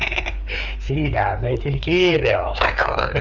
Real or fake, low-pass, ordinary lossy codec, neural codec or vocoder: fake; 7.2 kHz; none; codec, 16 kHz, 4 kbps, FreqCodec, larger model